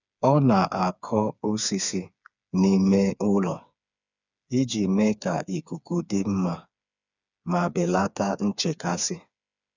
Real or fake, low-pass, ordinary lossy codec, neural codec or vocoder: fake; 7.2 kHz; none; codec, 16 kHz, 4 kbps, FreqCodec, smaller model